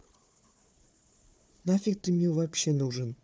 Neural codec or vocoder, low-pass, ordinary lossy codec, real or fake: codec, 16 kHz, 4 kbps, FunCodec, trained on Chinese and English, 50 frames a second; none; none; fake